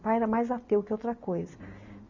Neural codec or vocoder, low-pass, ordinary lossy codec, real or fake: none; 7.2 kHz; Opus, 64 kbps; real